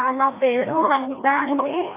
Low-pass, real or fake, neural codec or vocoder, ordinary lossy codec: 3.6 kHz; fake; codec, 16 kHz, 1 kbps, FreqCodec, larger model; AAC, 24 kbps